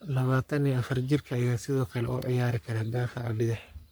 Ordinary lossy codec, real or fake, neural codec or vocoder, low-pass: none; fake; codec, 44.1 kHz, 3.4 kbps, Pupu-Codec; none